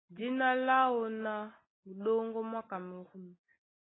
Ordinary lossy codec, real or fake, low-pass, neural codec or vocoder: AAC, 16 kbps; real; 7.2 kHz; none